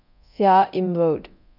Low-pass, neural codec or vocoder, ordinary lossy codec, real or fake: 5.4 kHz; codec, 24 kHz, 0.9 kbps, DualCodec; none; fake